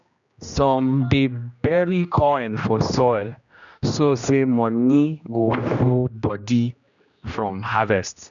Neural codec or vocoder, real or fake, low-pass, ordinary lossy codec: codec, 16 kHz, 1 kbps, X-Codec, HuBERT features, trained on general audio; fake; 7.2 kHz; none